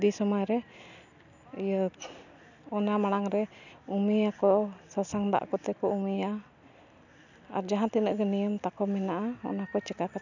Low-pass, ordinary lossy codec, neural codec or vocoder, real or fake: 7.2 kHz; none; none; real